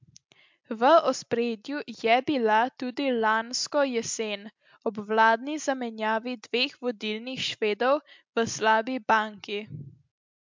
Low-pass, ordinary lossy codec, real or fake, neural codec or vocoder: 7.2 kHz; MP3, 64 kbps; real; none